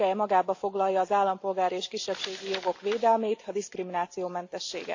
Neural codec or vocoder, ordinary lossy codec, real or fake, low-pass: none; AAC, 48 kbps; real; 7.2 kHz